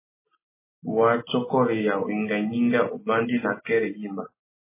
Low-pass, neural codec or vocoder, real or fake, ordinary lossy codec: 3.6 kHz; none; real; MP3, 16 kbps